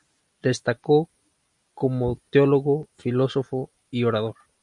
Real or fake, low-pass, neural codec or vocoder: real; 10.8 kHz; none